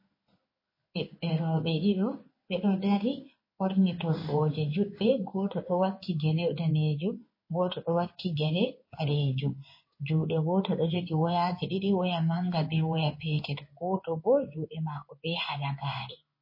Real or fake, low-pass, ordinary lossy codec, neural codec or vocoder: fake; 5.4 kHz; MP3, 24 kbps; codec, 16 kHz in and 24 kHz out, 1 kbps, XY-Tokenizer